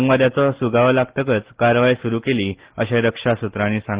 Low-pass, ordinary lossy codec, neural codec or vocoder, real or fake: 3.6 kHz; Opus, 16 kbps; none; real